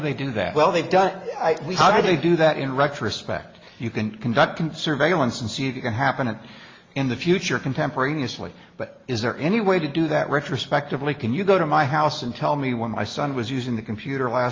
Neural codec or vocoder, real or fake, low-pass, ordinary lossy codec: none; real; 7.2 kHz; Opus, 32 kbps